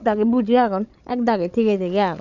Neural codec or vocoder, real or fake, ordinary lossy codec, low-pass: codec, 16 kHz, 16 kbps, FreqCodec, larger model; fake; none; 7.2 kHz